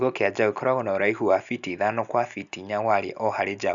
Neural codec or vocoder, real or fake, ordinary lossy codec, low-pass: none; real; MP3, 96 kbps; 7.2 kHz